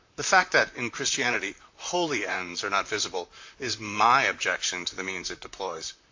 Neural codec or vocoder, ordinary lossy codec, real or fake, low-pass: vocoder, 44.1 kHz, 128 mel bands, Pupu-Vocoder; AAC, 48 kbps; fake; 7.2 kHz